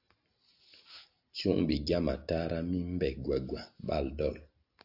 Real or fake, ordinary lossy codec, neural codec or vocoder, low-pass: real; AAC, 48 kbps; none; 5.4 kHz